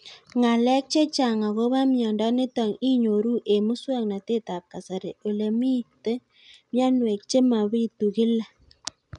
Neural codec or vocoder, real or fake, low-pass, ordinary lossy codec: none; real; 10.8 kHz; none